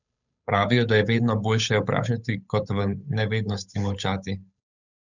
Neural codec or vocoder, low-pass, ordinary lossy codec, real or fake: codec, 16 kHz, 8 kbps, FunCodec, trained on Chinese and English, 25 frames a second; 7.2 kHz; none; fake